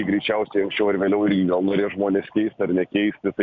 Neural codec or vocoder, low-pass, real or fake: none; 7.2 kHz; real